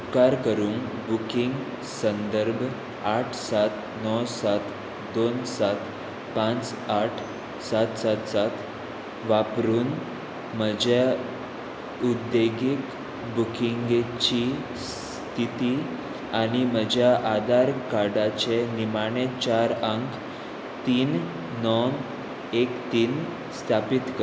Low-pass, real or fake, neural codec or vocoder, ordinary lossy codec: none; real; none; none